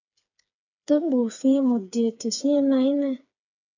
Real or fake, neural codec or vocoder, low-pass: fake; codec, 16 kHz, 4 kbps, FreqCodec, smaller model; 7.2 kHz